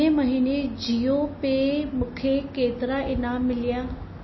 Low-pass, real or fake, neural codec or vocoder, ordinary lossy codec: 7.2 kHz; real; none; MP3, 24 kbps